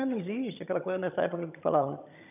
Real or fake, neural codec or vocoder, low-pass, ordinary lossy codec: fake; vocoder, 22.05 kHz, 80 mel bands, HiFi-GAN; 3.6 kHz; none